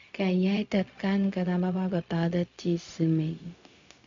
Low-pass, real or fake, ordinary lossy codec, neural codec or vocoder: 7.2 kHz; fake; MP3, 64 kbps; codec, 16 kHz, 0.4 kbps, LongCat-Audio-Codec